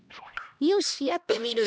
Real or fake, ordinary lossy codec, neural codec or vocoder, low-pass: fake; none; codec, 16 kHz, 1 kbps, X-Codec, HuBERT features, trained on LibriSpeech; none